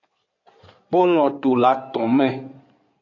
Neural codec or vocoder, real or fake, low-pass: codec, 16 kHz in and 24 kHz out, 2.2 kbps, FireRedTTS-2 codec; fake; 7.2 kHz